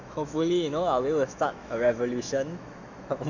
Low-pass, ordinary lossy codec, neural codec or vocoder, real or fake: 7.2 kHz; none; autoencoder, 48 kHz, 128 numbers a frame, DAC-VAE, trained on Japanese speech; fake